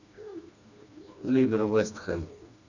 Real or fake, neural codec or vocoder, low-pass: fake; codec, 16 kHz, 2 kbps, FreqCodec, smaller model; 7.2 kHz